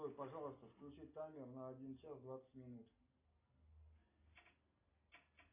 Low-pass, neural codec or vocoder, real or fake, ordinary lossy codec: 3.6 kHz; none; real; AAC, 32 kbps